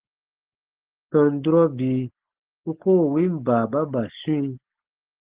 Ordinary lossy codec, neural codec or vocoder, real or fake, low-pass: Opus, 16 kbps; none; real; 3.6 kHz